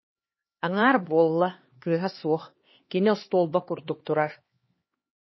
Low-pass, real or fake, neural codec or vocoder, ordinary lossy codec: 7.2 kHz; fake; codec, 16 kHz, 2 kbps, X-Codec, HuBERT features, trained on LibriSpeech; MP3, 24 kbps